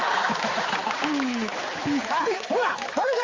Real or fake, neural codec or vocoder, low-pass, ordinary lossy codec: fake; codec, 16 kHz, 4 kbps, X-Codec, HuBERT features, trained on balanced general audio; 7.2 kHz; Opus, 32 kbps